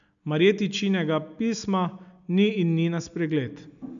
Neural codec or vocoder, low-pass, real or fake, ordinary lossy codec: none; 7.2 kHz; real; none